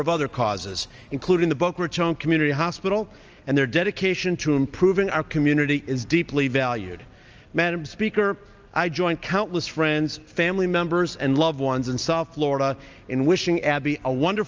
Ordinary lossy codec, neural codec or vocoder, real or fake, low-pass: Opus, 24 kbps; none; real; 7.2 kHz